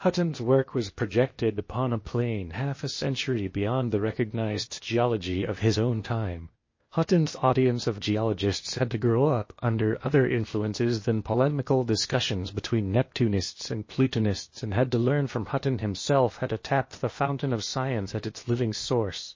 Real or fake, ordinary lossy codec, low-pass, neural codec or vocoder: fake; MP3, 32 kbps; 7.2 kHz; codec, 16 kHz, 0.8 kbps, ZipCodec